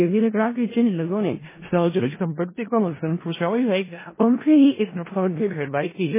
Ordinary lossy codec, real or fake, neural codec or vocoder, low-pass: MP3, 16 kbps; fake; codec, 16 kHz in and 24 kHz out, 0.4 kbps, LongCat-Audio-Codec, four codebook decoder; 3.6 kHz